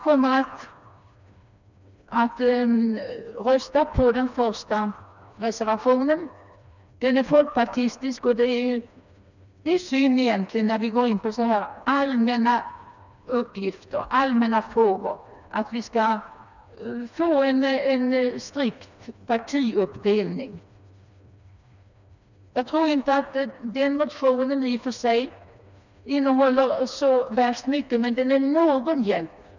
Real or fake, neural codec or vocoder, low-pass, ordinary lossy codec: fake; codec, 16 kHz, 2 kbps, FreqCodec, smaller model; 7.2 kHz; none